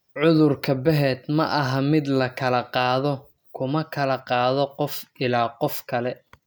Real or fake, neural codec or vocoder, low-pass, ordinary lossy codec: real; none; none; none